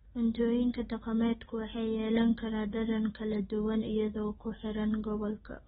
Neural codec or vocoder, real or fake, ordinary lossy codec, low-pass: none; real; AAC, 16 kbps; 19.8 kHz